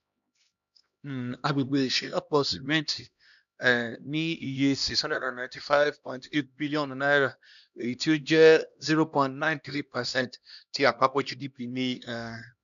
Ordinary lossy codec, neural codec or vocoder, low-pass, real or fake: none; codec, 16 kHz, 1 kbps, X-Codec, HuBERT features, trained on LibriSpeech; 7.2 kHz; fake